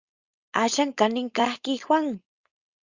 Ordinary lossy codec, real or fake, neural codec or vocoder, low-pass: Opus, 64 kbps; fake; codec, 16 kHz, 4.8 kbps, FACodec; 7.2 kHz